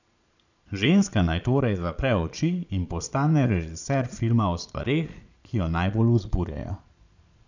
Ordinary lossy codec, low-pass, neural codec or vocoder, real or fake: none; 7.2 kHz; vocoder, 22.05 kHz, 80 mel bands, Vocos; fake